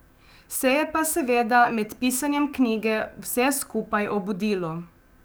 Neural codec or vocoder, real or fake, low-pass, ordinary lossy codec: codec, 44.1 kHz, 7.8 kbps, DAC; fake; none; none